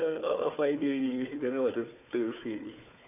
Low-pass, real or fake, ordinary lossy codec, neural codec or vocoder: 3.6 kHz; fake; none; codec, 16 kHz, 4 kbps, FunCodec, trained on Chinese and English, 50 frames a second